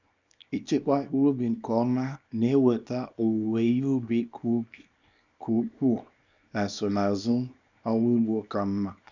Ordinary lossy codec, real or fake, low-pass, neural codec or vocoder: none; fake; 7.2 kHz; codec, 24 kHz, 0.9 kbps, WavTokenizer, small release